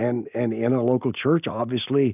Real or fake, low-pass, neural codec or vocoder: real; 3.6 kHz; none